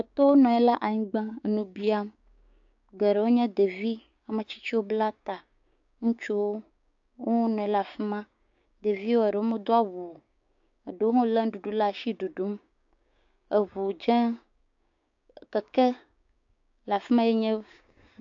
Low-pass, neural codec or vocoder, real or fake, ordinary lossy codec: 7.2 kHz; codec, 16 kHz, 6 kbps, DAC; fake; AAC, 64 kbps